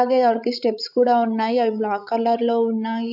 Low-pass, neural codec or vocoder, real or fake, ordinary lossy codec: 5.4 kHz; codec, 16 kHz, 16 kbps, FreqCodec, larger model; fake; AAC, 48 kbps